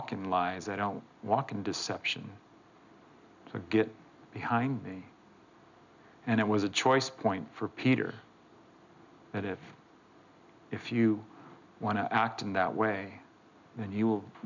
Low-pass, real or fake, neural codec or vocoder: 7.2 kHz; real; none